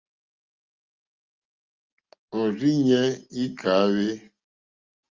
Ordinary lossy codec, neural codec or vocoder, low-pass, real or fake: Opus, 32 kbps; none; 7.2 kHz; real